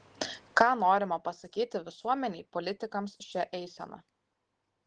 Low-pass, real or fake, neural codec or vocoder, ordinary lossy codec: 9.9 kHz; real; none; Opus, 16 kbps